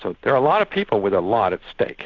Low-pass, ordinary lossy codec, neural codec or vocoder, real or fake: 7.2 kHz; AAC, 48 kbps; none; real